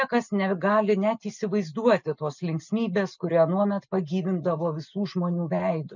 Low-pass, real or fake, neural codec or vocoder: 7.2 kHz; real; none